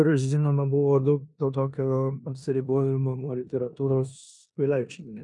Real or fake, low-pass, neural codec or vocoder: fake; 10.8 kHz; codec, 16 kHz in and 24 kHz out, 0.9 kbps, LongCat-Audio-Codec, four codebook decoder